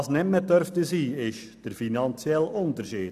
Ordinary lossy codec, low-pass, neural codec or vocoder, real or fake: none; 14.4 kHz; none; real